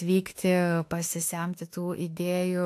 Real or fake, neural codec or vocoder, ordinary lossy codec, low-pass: fake; autoencoder, 48 kHz, 32 numbers a frame, DAC-VAE, trained on Japanese speech; AAC, 64 kbps; 14.4 kHz